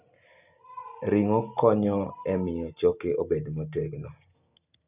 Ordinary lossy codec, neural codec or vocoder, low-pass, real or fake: none; none; 3.6 kHz; real